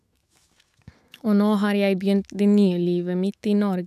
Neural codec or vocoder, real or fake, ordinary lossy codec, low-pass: autoencoder, 48 kHz, 128 numbers a frame, DAC-VAE, trained on Japanese speech; fake; none; 14.4 kHz